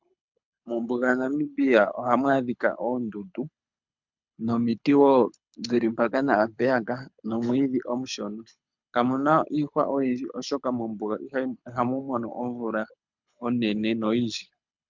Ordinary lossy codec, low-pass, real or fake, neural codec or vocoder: MP3, 64 kbps; 7.2 kHz; fake; codec, 24 kHz, 6 kbps, HILCodec